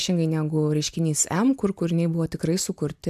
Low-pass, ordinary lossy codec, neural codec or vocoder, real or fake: 14.4 kHz; AAC, 96 kbps; none; real